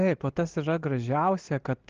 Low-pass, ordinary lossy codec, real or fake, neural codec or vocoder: 7.2 kHz; Opus, 16 kbps; real; none